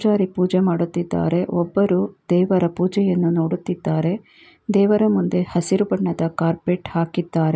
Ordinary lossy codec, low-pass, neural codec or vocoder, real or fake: none; none; none; real